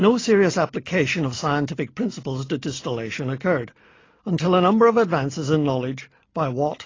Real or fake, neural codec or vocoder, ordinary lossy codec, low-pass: real; none; AAC, 32 kbps; 7.2 kHz